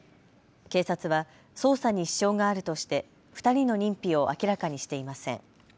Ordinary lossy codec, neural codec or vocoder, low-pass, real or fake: none; none; none; real